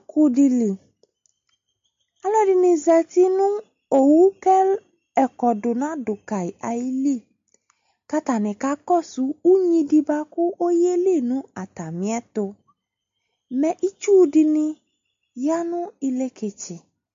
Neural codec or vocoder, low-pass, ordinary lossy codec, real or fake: none; 7.2 kHz; MP3, 48 kbps; real